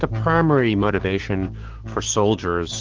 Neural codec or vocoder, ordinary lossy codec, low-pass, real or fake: codec, 16 kHz, 6 kbps, DAC; Opus, 16 kbps; 7.2 kHz; fake